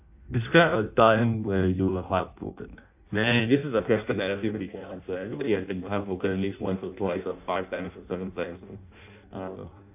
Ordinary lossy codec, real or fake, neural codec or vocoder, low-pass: AAC, 32 kbps; fake; codec, 16 kHz in and 24 kHz out, 0.6 kbps, FireRedTTS-2 codec; 3.6 kHz